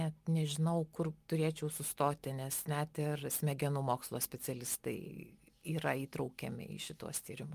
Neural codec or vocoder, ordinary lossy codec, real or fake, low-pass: none; Opus, 32 kbps; real; 14.4 kHz